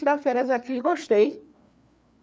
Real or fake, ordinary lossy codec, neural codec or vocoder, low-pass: fake; none; codec, 16 kHz, 4 kbps, FreqCodec, larger model; none